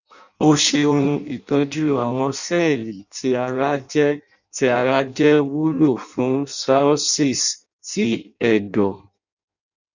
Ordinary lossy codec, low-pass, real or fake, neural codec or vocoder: none; 7.2 kHz; fake; codec, 16 kHz in and 24 kHz out, 0.6 kbps, FireRedTTS-2 codec